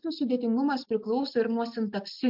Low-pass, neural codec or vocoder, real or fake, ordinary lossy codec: 5.4 kHz; none; real; MP3, 48 kbps